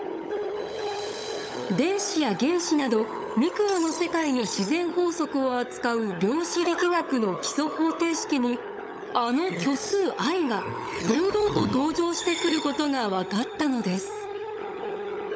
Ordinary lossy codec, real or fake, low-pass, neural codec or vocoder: none; fake; none; codec, 16 kHz, 16 kbps, FunCodec, trained on LibriTTS, 50 frames a second